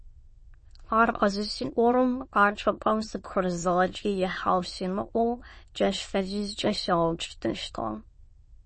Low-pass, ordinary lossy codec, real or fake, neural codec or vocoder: 9.9 kHz; MP3, 32 kbps; fake; autoencoder, 22.05 kHz, a latent of 192 numbers a frame, VITS, trained on many speakers